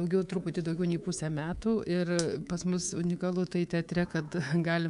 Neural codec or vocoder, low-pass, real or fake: codec, 24 kHz, 3.1 kbps, DualCodec; 10.8 kHz; fake